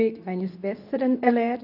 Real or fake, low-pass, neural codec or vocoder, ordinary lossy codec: fake; 5.4 kHz; codec, 24 kHz, 0.9 kbps, WavTokenizer, medium speech release version 2; none